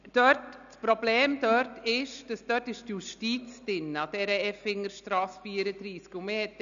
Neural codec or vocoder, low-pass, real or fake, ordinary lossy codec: none; 7.2 kHz; real; none